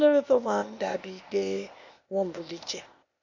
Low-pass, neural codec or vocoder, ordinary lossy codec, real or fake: 7.2 kHz; codec, 16 kHz, 0.8 kbps, ZipCodec; none; fake